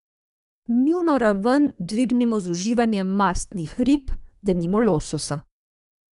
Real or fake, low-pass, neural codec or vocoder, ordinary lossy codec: fake; 10.8 kHz; codec, 24 kHz, 1 kbps, SNAC; none